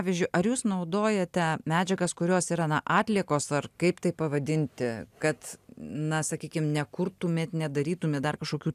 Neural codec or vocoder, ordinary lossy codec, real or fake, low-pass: none; AAC, 96 kbps; real; 14.4 kHz